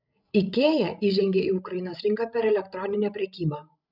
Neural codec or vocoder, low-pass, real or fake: codec, 16 kHz, 16 kbps, FreqCodec, larger model; 5.4 kHz; fake